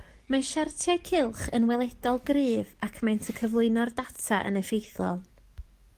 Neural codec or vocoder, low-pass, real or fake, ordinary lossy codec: codec, 44.1 kHz, 7.8 kbps, DAC; 14.4 kHz; fake; Opus, 24 kbps